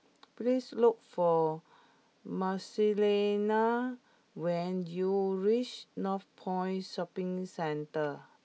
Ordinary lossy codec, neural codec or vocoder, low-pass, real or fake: none; none; none; real